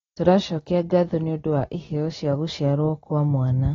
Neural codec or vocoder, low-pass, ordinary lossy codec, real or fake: none; 7.2 kHz; AAC, 24 kbps; real